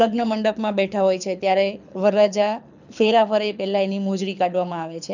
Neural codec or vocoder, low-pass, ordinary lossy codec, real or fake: codec, 24 kHz, 6 kbps, HILCodec; 7.2 kHz; none; fake